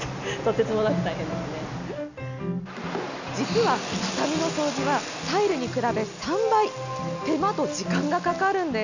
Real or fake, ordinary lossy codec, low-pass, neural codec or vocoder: real; none; 7.2 kHz; none